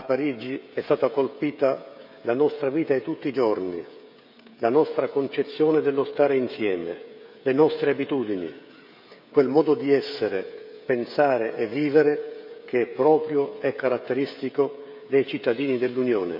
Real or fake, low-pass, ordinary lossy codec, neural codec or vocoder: fake; 5.4 kHz; none; codec, 16 kHz, 16 kbps, FreqCodec, smaller model